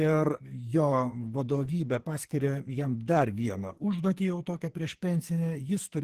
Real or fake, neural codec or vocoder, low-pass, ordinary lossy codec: fake; codec, 44.1 kHz, 2.6 kbps, SNAC; 14.4 kHz; Opus, 16 kbps